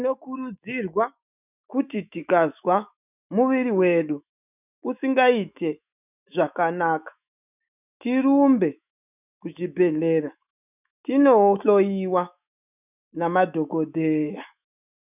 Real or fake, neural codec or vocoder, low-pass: fake; autoencoder, 48 kHz, 128 numbers a frame, DAC-VAE, trained on Japanese speech; 3.6 kHz